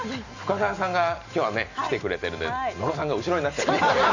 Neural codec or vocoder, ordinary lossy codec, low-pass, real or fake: none; none; 7.2 kHz; real